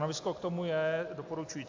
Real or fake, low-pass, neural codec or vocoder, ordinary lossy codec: real; 7.2 kHz; none; AAC, 48 kbps